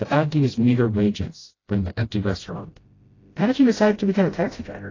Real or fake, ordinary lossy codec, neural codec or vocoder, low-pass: fake; AAC, 32 kbps; codec, 16 kHz, 0.5 kbps, FreqCodec, smaller model; 7.2 kHz